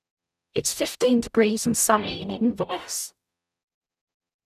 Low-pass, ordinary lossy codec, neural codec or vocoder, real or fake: 14.4 kHz; none; codec, 44.1 kHz, 0.9 kbps, DAC; fake